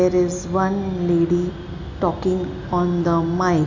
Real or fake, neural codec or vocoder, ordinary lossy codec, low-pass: real; none; none; 7.2 kHz